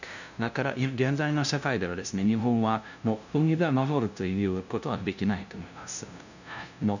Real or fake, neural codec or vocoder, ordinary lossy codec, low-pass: fake; codec, 16 kHz, 0.5 kbps, FunCodec, trained on LibriTTS, 25 frames a second; none; 7.2 kHz